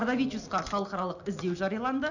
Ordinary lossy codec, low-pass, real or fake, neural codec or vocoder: none; 7.2 kHz; real; none